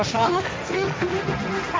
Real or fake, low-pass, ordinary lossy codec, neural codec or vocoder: fake; none; none; codec, 16 kHz, 1.1 kbps, Voila-Tokenizer